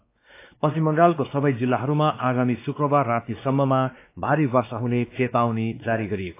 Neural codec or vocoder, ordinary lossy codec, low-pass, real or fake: codec, 16 kHz, 2 kbps, X-Codec, WavLM features, trained on Multilingual LibriSpeech; AAC, 24 kbps; 3.6 kHz; fake